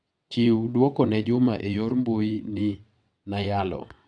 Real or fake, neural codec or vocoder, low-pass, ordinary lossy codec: fake; vocoder, 22.05 kHz, 80 mel bands, WaveNeXt; 9.9 kHz; none